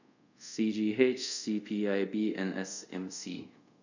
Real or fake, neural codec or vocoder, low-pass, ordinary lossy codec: fake; codec, 24 kHz, 0.5 kbps, DualCodec; 7.2 kHz; none